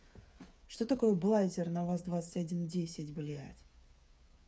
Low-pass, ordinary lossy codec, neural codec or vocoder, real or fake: none; none; codec, 16 kHz, 8 kbps, FreqCodec, smaller model; fake